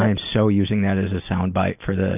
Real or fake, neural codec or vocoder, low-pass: real; none; 3.6 kHz